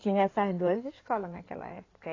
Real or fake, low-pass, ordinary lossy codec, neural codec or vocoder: fake; 7.2 kHz; AAC, 32 kbps; codec, 16 kHz in and 24 kHz out, 2.2 kbps, FireRedTTS-2 codec